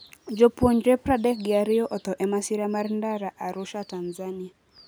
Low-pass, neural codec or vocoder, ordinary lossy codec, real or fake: none; none; none; real